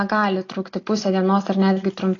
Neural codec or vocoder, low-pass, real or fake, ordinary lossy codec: none; 7.2 kHz; real; AAC, 32 kbps